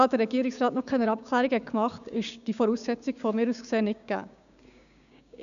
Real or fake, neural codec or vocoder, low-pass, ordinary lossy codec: fake; codec, 16 kHz, 6 kbps, DAC; 7.2 kHz; none